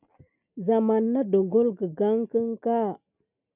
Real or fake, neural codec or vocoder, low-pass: real; none; 3.6 kHz